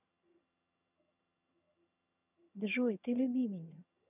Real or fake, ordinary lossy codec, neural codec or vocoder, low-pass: fake; none; vocoder, 22.05 kHz, 80 mel bands, HiFi-GAN; 3.6 kHz